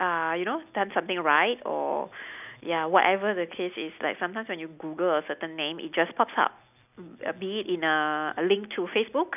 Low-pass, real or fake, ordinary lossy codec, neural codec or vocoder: 3.6 kHz; real; none; none